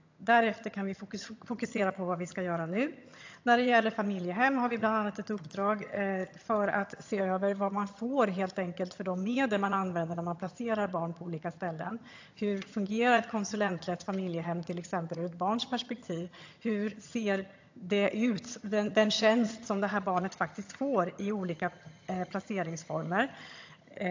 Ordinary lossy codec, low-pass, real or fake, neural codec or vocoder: AAC, 48 kbps; 7.2 kHz; fake; vocoder, 22.05 kHz, 80 mel bands, HiFi-GAN